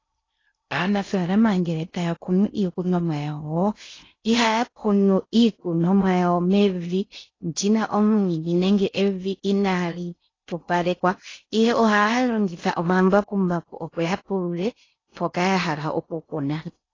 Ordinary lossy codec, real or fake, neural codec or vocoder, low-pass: AAC, 32 kbps; fake; codec, 16 kHz in and 24 kHz out, 0.6 kbps, FocalCodec, streaming, 2048 codes; 7.2 kHz